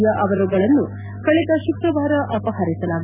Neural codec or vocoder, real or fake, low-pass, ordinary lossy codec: none; real; 3.6 kHz; none